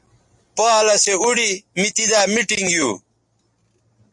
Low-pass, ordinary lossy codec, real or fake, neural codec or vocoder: 10.8 kHz; MP3, 48 kbps; real; none